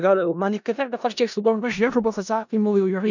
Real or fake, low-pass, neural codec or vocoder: fake; 7.2 kHz; codec, 16 kHz in and 24 kHz out, 0.4 kbps, LongCat-Audio-Codec, four codebook decoder